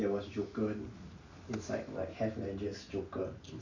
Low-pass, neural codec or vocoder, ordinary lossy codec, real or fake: 7.2 kHz; none; none; real